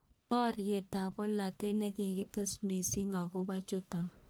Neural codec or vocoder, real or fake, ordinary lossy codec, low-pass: codec, 44.1 kHz, 1.7 kbps, Pupu-Codec; fake; none; none